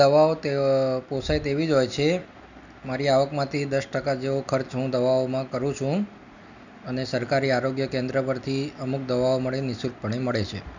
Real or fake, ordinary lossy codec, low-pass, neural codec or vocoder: real; none; 7.2 kHz; none